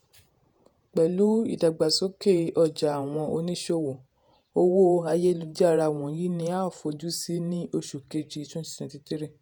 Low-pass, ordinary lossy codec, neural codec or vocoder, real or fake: none; none; vocoder, 48 kHz, 128 mel bands, Vocos; fake